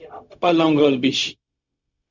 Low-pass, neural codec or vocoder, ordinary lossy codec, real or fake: 7.2 kHz; codec, 16 kHz, 0.4 kbps, LongCat-Audio-Codec; Opus, 64 kbps; fake